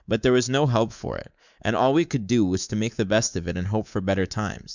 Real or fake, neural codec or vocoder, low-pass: fake; codec, 24 kHz, 3.1 kbps, DualCodec; 7.2 kHz